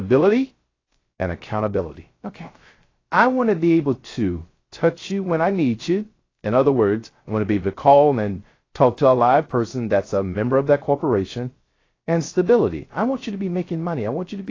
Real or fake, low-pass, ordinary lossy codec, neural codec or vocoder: fake; 7.2 kHz; AAC, 32 kbps; codec, 16 kHz, 0.3 kbps, FocalCodec